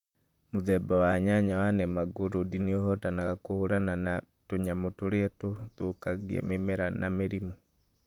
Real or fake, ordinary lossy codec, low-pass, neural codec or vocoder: fake; none; 19.8 kHz; vocoder, 44.1 kHz, 128 mel bands, Pupu-Vocoder